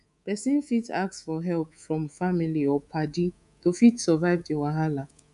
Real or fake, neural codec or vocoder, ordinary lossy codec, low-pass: fake; codec, 24 kHz, 3.1 kbps, DualCodec; none; 10.8 kHz